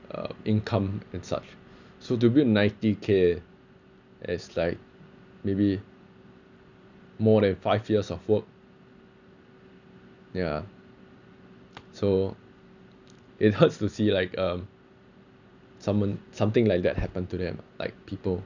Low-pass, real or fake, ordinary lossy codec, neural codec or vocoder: 7.2 kHz; real; none; none